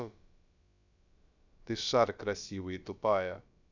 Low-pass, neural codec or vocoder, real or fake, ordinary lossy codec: 7.2 kHz; codec, 16 kHz, about 1 kbps, DyCAST, with the encoder's durations; fake; none